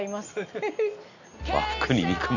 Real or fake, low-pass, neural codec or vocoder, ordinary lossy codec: real; 7.2 kHz; none; none